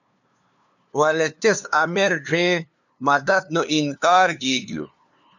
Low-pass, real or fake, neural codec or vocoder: 7.2 kHz; fake; codec, 16 kHz, 2 kbps, FunCodec, trained on LibriTTS, 25 frames a second